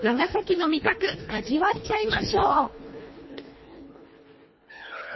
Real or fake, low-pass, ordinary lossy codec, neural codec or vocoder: fake; 7.2 kHz; MP3, 24 kbps; codec, 24 kHz, 1.5 kbps, HILCodec